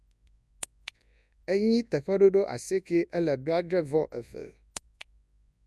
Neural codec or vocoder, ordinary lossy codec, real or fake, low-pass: codec, 24 kHz, 0.9 kbps, WavTokenizer, large speech release; none; fake; none